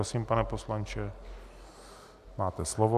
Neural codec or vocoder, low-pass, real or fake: none; 14.4 kHz; real